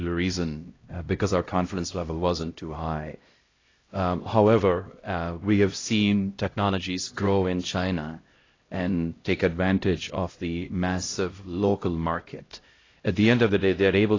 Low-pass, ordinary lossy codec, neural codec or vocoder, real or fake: 7.2 kHz; AAC, 32 kbps; codec, 16 kHz, 0.5 kbps, X-Codec, HuBERT features, trained on LibriSpeech; fake